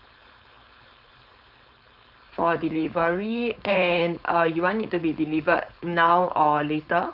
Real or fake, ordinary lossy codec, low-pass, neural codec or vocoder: fake; none; 5.4 kHz; codec, 16 kHz, 4.8 kbps, FACodec